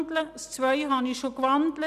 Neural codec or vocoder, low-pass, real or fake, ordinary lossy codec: none; 14.4 kHz; real; none